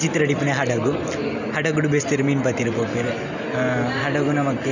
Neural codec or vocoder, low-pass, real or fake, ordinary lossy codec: none; 7.2 kHz; real; none